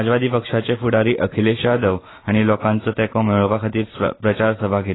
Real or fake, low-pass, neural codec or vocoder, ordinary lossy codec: real; 7.2 kHz; none; AAC, 16 kbps